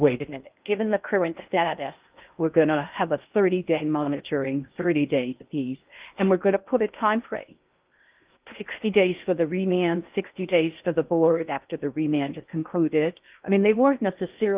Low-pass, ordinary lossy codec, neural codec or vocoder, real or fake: 3.6 kHz; Opus, 24 kbps; codec, 16 kHz in and 24 kHz out, 0.8 kbps, FocalCodec, streaming, 65536 codes; fake